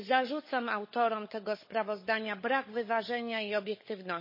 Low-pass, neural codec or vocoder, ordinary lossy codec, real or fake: 5.4 kHz; codec, 16 kHz, 16 kbps, FunCodec, trained on LibriTTS, 50 frames a second; MP3, 24 kbps; fake